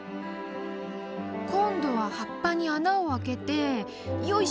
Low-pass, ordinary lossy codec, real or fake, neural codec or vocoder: none; none; real; none